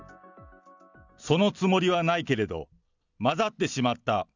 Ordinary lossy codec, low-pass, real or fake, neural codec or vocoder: none; 7.2 kHz; real; none